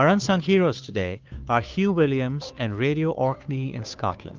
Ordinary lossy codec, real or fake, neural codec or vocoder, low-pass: Opus, 24 kbps; fake; codec, 16 kHz, 2 kbps, FunCodec, trained on Chinese and English, 25 frames a second; 7.2 kHz